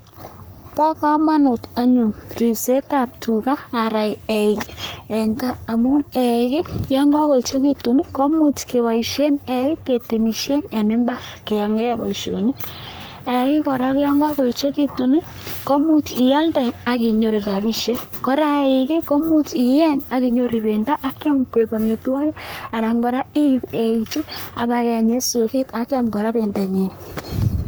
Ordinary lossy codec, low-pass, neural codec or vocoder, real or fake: none; none; codec, 44.1 kHz, 3.4 kbps, Pupu-Codec; fake